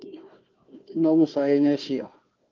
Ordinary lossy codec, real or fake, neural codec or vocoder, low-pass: Opus, 32 kbps; fake; codec, 16 kHz, 1 kbps, FunCodec, trained on Chinese and English, 50 frames a second; 7.2 kHz